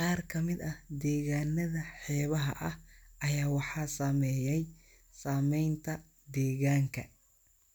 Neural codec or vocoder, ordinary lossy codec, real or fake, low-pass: none; none; real; none